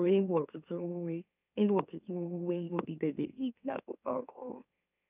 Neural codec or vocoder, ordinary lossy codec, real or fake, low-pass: autoencoder, 44.1 kHz, a latent of 192 numbers a frame, MeloTTS; none; fake; 3.6 kHz